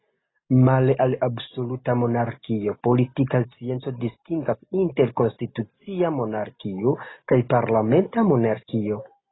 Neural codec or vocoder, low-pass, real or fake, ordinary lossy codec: none; 7.2 kHz; real; AAC, 16 kbps